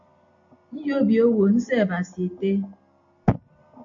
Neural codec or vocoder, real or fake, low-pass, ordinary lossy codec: none; real; 7.2 kHz; AAC, 48 kbps